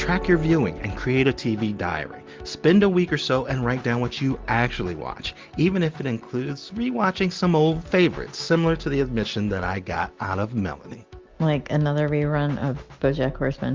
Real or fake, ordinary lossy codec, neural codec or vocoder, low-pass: real; Opus, 24 kbps; none; 7.2 kHz